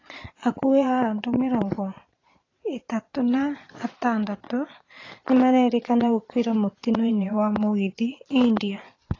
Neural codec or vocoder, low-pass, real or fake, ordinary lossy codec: vocoder, 22.05 kHz, 80 mel bands, Vocos; 7.2 kHz; fake; AAC, 32 kbps